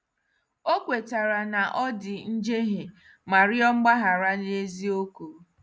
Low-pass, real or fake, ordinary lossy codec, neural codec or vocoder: none; real; none; none